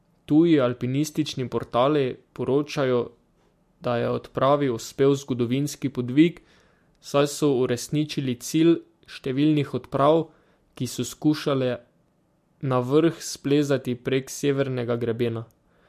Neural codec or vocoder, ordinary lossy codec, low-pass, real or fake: none; MP3, 64 kbps; 14.4 kHz; real